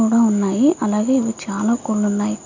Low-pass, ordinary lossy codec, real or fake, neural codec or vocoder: 7.2 kHz; none; real; none